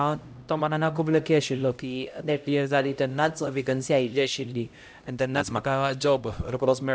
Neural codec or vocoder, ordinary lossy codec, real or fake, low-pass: codec, 16 kHz, 0.5 kbps, X-Codec, HuBERT features, trained on LibriSpeech; none; fake; none